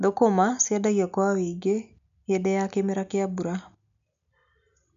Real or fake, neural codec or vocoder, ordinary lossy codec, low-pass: real; none; none; 7.2 kHz